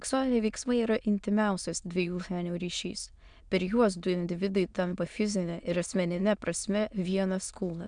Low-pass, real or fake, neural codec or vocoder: 9.9 kHz; fake; autoencoder, 22.05 kHz, a latent of 192 numbers a frame, VITS, trained on many speakers